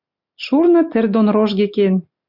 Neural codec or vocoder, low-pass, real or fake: none; 5.4 kHz; real